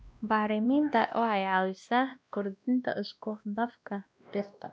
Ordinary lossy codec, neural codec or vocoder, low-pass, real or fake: none; codec, 16 kHz, 1 kbps, X-Codec, WavLM features, trained on Multilingual LibriSpeech; none; fake